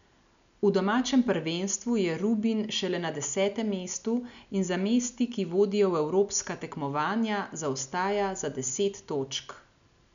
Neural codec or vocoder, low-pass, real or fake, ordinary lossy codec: none; 7.2 kHz; real; none